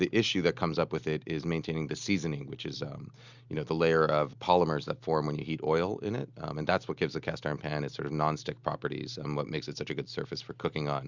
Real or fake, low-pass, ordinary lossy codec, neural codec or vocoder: real; 7.2 kHz; Opus, 64 kbps; none